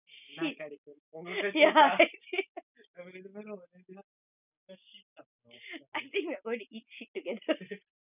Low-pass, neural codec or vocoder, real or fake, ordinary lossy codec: 3.6 kHz; none; real; none